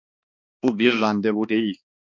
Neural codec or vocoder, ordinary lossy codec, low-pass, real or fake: codec, 16 kHz, 2 kbps, X-Codec, HuBERT features, trained on balanced general audio; MP3, 48 kbps; 7.2 kHz; fake